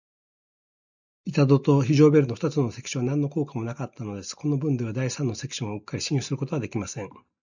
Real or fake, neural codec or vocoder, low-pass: real; none; 7.2 kHz